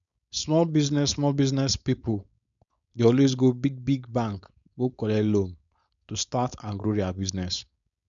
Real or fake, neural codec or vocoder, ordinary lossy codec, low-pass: fake; codec, 16 kHz, 4.8 kbps, FACodec; none; 7.2 kHz